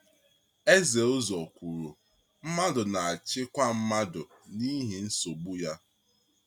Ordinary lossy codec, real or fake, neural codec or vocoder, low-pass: none; real; none; 19.8 kHz